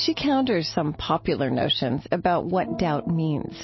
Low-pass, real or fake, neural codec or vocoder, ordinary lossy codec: 7.2 kHz; real; none; MP3, 24 kbps